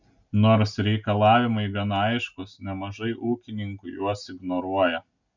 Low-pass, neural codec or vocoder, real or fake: 7.2 kHz; none; real